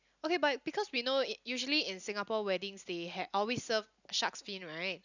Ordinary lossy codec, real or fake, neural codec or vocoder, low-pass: none; real; none; 7.2 kHz